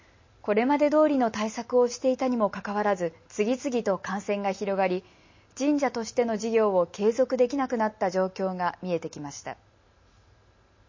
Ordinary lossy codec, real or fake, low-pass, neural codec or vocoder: MP3, 32 kbps; real; 7.2 kHz; none